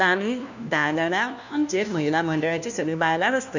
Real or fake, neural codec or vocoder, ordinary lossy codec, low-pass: fake; codec, 16 kHz, 1 kbps, FunCodec, trained on LibriTTS, 50 frames a second; none; 7.2 kHz